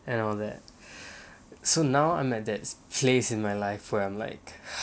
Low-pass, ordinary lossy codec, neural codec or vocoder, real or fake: none; none; none; real